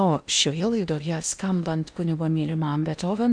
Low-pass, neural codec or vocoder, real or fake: 9.9 kHz; codec, 16 kHz in and 24 kHz out, 0.8 kbps, FocalCodec, streaming, 65536 codes; fake